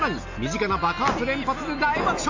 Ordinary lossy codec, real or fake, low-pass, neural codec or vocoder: AAC, 48 kbps; real; 7.2 kHz; none